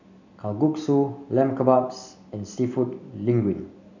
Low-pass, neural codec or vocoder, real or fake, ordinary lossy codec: 7.2 kHz; none; real; none